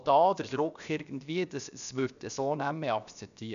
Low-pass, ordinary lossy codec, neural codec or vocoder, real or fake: 7.2 kHz; none; codec, 16 kHz, about 1 kbps, DyCAST, with the encoder's durations; fake